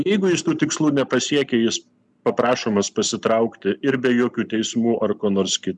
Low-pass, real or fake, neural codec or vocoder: 10.8 kHz; real; none